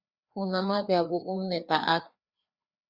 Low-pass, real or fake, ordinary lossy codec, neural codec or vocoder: 5.4 kHz; fake; Opus, 64 kbps; codec, 16 kHz, 2 kbps, FreqCodec, larger model